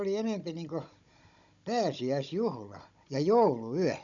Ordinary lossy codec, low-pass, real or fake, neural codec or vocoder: none; 7.2 kHz; fake; codec, 16 kHz, 16 kbps, FunCodec, trained on Chinese and English, 50 frames a second